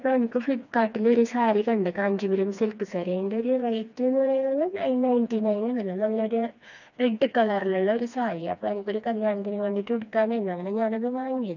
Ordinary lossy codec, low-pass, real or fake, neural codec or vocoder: none; 7.2 kHz; fake; codec, 16 kHz, 2 kbps, FreqCodec, smaller model